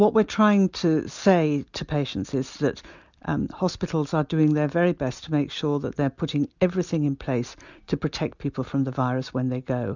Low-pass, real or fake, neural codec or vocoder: 7.2 kHz; real; none